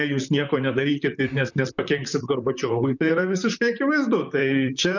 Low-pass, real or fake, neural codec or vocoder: 7.2 kHz; fake; vocoder, 44.1 kHz, 128 mel bands, Pupu-Vocoder